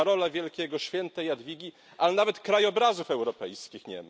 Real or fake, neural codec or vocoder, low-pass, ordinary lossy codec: real; none; none; none